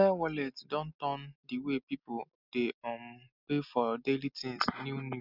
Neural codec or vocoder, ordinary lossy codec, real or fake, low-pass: none; none; real; 5.4 kHz